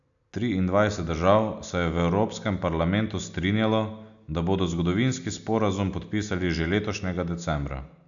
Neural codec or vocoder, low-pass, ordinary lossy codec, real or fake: none; 7.2 kHz; none; real